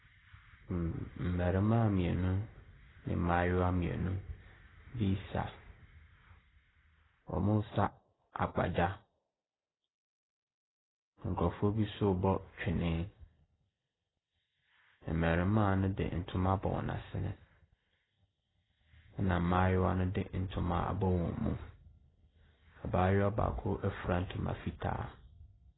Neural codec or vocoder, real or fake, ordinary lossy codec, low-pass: none; real; AAC, 16 kbps; 7.2 kHz